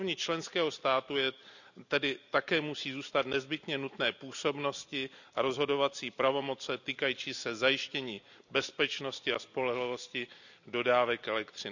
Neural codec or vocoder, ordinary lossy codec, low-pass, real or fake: none; none; 7.2 kHz; real